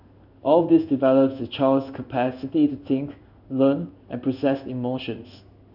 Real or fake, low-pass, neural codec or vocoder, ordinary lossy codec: fake; 5.4 kHz; codec, 16 kHz in and 24 kHz out, 1 kbps, XY-Tokenizer; none